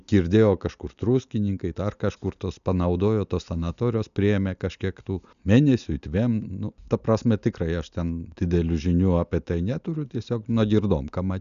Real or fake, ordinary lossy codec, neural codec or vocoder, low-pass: real; MP3, 96 kbps; none; 7.2 kHz